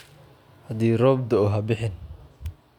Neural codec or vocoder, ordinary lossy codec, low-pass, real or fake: none; none; 19.8 kHz; real